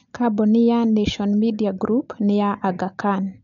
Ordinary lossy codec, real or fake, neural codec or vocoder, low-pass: none; real; none; 7.2 kHz